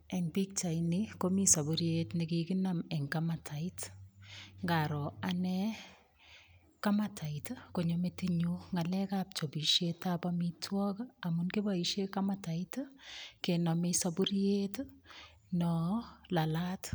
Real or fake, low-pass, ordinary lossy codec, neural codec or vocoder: real; none; none; none